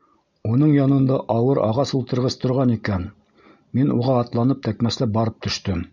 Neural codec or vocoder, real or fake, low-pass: none; real; 7.2 kHz